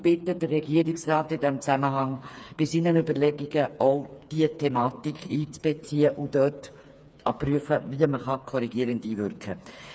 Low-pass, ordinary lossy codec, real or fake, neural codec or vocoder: none; none; fake; codec, 16 kHz, 4 kbps, FreqCodec, smaller model